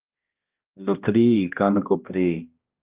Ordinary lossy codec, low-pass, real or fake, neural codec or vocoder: Opus, 32 kbps; 3.6 kHz; fake; codec, 16 kHz, 1 kbps, X-Codec, HuBERT features, trained on balanced general audio